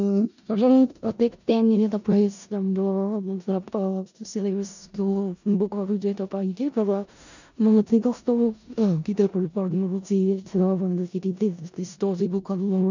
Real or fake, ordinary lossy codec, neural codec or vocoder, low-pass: fake; none; codec, 16 kHz in and 24 kHz out, 0.4 kbps, LongCat-Audio-Codec, four codebook decoder; 7.2 kHz